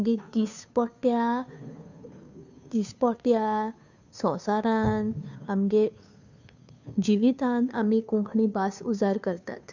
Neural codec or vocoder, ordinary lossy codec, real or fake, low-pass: codec, 16 kHz, 2 kbps, FunCodec, trained on Chinese and English, 25 frames a second; none; fake; 7.2 kHz